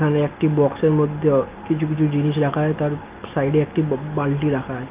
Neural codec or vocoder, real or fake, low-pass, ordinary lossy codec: none; real; 3.6 kHz; Opus, 24 kbps